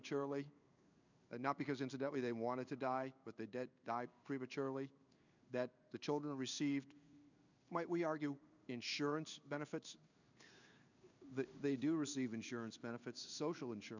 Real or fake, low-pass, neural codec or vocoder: fake; 7.2 kHz; codec, 16 kHz in and 24 kHz out, 1 kbps, XY-Tokenizer